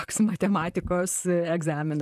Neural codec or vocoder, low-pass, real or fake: vocoder, 44.1 kHz, 128 mel bands, Pupu-Vocoder; 14.4 kHz; fake